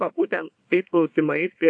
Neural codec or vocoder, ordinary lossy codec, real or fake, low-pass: codec, 24 kHz, 0.9 kbps, WavTokenizer, small release; AAC, 64 kbps; fake; 9.9 kHz